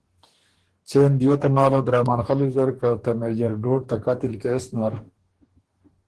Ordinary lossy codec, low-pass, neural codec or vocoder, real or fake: Opus, 16 kbps; 10.8 kHz; codec, 44.1 kHz, 2.6 kbps, DAC; fake